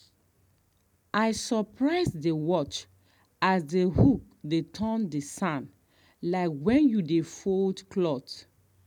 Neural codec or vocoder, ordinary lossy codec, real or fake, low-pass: none; none; real; 19.8 kHz